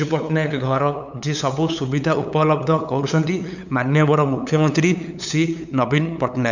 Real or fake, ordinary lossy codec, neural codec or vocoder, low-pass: fake; none; codec, 16 kHz, 8 kbps, FunCodec, trained on LibriTTS, 25 frames a second; 7.2 kHz